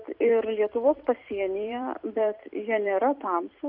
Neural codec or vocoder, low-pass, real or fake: vocoder, 44.1 kHz, 128 mel bands every 256 samples, BigVGAN v2; 5.4 kHz; fake